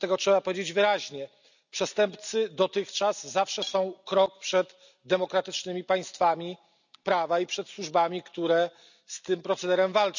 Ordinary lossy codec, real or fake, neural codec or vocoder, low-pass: none; real; none; 7.2 kHz